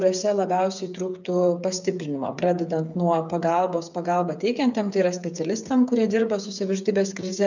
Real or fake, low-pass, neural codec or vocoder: fake; 7.2 kHz; codec, 16 kHz, 8 kbps, FreqCodec, smaller model